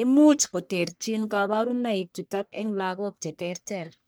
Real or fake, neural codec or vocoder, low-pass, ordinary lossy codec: fake; codec, 44.1 kHz, 1.7 kbps, Pupu-Codec; none; none